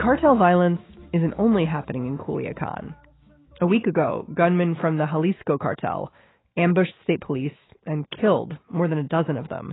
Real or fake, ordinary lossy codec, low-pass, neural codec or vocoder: fake; AAC, 16 kbps; 7.2 kHz; autoencoder, 48 kHz, 128 numbers a frame, DAC-VAE, trained on Japanese speech